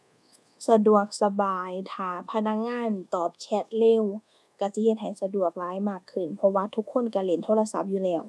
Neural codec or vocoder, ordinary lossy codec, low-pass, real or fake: codec, 24 kHz, 1.2 kbps, DualCodec; none; none; fake